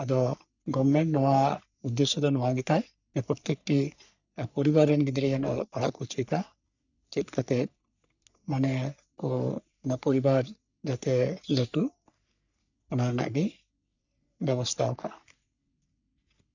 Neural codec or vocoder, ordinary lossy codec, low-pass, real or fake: codec, 44.1 kHz, 3.4 kbps, Pupu-Codec; none; 7.2 kHz; fake